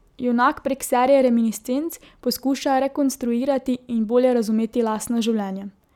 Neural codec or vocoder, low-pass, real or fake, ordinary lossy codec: none; 19.8 kHz; real; none